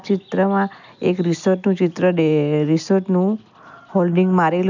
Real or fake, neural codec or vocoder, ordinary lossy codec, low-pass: real; none; none; 7.2 kHz